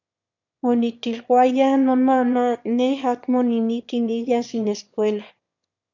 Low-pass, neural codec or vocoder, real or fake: 7.2 kHz; autoencoder, 22.05 kHz, a latent of 192 numbers a frame, VITS, trained on one speaker; fake